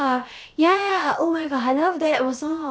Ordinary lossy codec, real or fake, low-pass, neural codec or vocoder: none; fake; none; codec, 16 kHz, about 1 kbps, DyCAST, with the encoder's durations